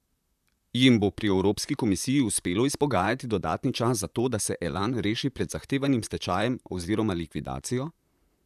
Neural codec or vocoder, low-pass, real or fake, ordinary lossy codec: vocoder, 44.1 kHz, 128 mel bands, Pupu-Vocoder; 14.4 kHz; fake; none